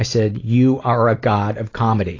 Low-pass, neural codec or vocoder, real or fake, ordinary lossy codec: 7.2 kHz; none; real; AAC, 32 kbps